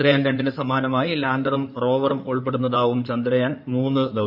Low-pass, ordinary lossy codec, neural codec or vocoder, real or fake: 5.4 kHz; none; codec, 16 kHz in and 24 kHz out, 2.2 kbps, FireRedTTS-2 codec; fake